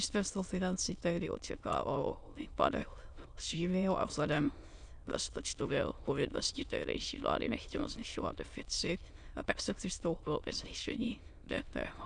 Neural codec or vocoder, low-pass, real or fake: autoencoder, 22.05 kHz, a latent of 192 numbers a frame, VITS, trained on many speakers; 9.9 kHz; fake